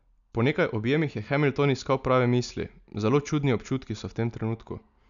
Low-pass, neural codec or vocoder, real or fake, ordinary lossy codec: 7.2 kHz; none; real; none